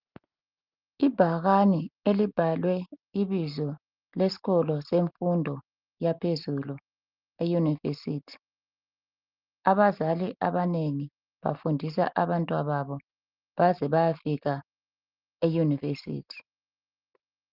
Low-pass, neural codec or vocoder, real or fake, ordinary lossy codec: 5.4 kHz; none; real; Opus, 32 kbps